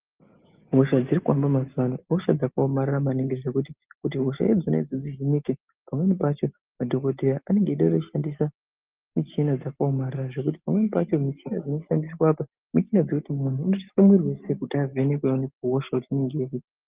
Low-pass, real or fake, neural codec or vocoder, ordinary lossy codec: 3.6 kHz; real; none; Opus, 32 kbps